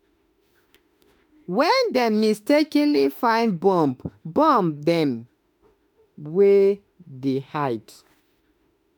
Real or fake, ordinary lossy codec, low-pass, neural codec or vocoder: fake; none; none; autoencoder, 48 kHz, 32 numbers a frame, DAC-VAE, trained on Japanese speech